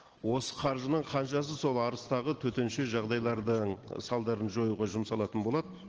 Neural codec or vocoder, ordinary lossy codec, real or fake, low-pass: none; Opus, 16 kbps; real; 7.2 kHz